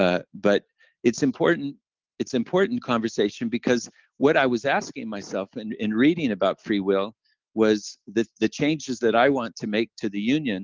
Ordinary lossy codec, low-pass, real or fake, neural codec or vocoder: Opus, 32 kbps; 7.2 kHz; real; none